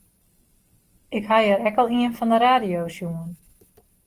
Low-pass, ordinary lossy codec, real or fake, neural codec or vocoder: 14.4 kHz; Opus, 24 kbps; real; none